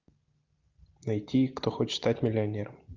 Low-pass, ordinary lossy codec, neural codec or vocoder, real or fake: 7.2 kHz; Opus, 32 kbps; none; real